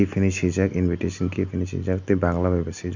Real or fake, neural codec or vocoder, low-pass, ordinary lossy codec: real; none; 7.2 kHz; none